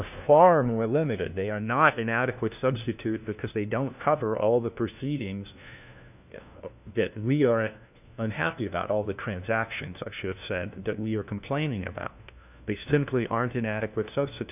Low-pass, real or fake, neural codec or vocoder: 3.6 kHz; fake; codec, 16 kHz, 1 kbps, FunCodec, trained on LibriTTS, 50 frames a second